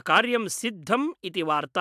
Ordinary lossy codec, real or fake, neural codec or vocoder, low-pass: AAC, 96 kbps; real; none; 14.4 kHz